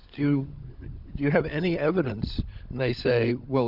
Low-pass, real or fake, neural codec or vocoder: 5.4 kHz; fake; codec, 16 kHz, 16 kbps, FunCodec, trained on LibriTTS, 50 frames a second